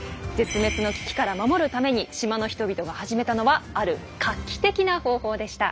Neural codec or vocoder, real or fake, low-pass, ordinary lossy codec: none; real; none; none